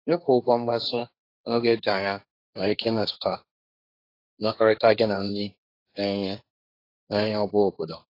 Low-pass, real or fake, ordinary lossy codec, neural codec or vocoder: 5.4 kHz; fake; AAC, 32 kbps; codec, 16 kHz, 1.1 kbps, Voila-Tokenizer